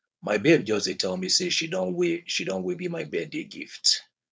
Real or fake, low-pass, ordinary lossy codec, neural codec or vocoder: fake; none; none; codec, 16 kHz, 4.8 kbps, FACodec